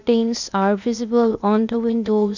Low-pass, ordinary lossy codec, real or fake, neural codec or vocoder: 7.2 kHz; none; fake; codec, 16 kHz, 0.8 kbps, ZipCodec